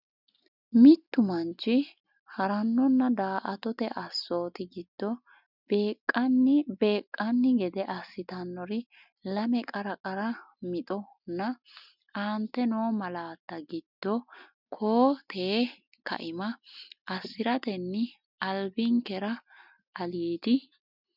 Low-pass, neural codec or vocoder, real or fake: 5.4 kHz; none; real